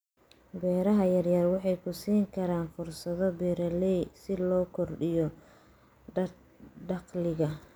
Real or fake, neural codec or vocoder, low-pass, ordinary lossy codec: real; none; none; none